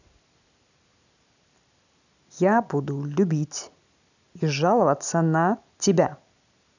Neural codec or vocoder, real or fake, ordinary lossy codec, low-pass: none; real; none; 7.2 kHz